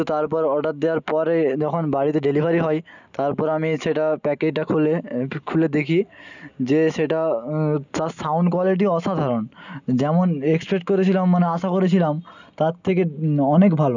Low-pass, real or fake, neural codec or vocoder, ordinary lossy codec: 7.2 kHz; real; none; none